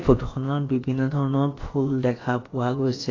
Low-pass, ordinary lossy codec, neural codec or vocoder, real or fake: 7.2 kHz; AAC, 32 kbps; codec, 16 kHz, about 1 kbps, DyCAST, with the encoder's durations; fake